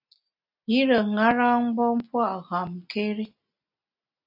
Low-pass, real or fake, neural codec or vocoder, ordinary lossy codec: 5.4 kHz; real; none; AAC, 48 kbps